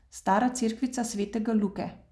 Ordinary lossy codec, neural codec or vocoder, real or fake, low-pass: none; none; real; none